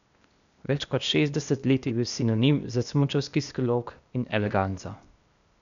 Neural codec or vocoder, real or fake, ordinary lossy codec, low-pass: codec, 16 kHz, 0.8 kbps, ZipCodec; fake; none; 7.2 kHz